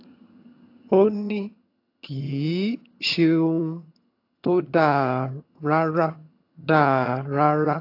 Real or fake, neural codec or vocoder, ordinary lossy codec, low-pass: fake; vocoder, 22.05 kHz, 80 mel bands, HiFi-GAN; AAC, 32 kbps; 5.4 kHz